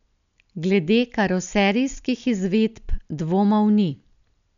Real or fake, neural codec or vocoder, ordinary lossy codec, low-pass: real; none; none; 7.2 kHz